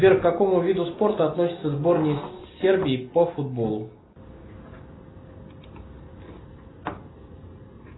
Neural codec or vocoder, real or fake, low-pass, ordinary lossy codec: none; real; 7.2 kHz; AAC, 16 kbps